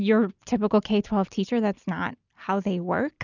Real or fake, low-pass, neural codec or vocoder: real; 7.2 kHz; none